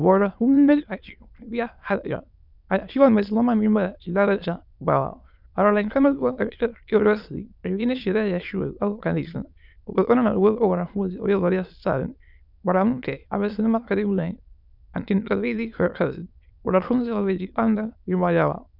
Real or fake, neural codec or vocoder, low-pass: fake; autoencoder, 22.05 kHz, a latent of 192 numbers a frame, VITS, trained on many speakers; 5.4 kHz